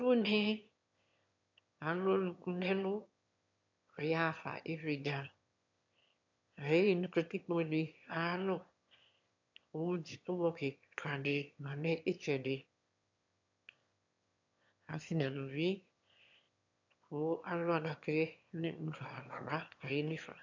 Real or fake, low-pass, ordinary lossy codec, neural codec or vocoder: fake; 7.2 kHz; MP3, 64 kbps; autoencoder, 22.05 kHz, a latent of 192 numbers a frame, VITS, trained on one speaker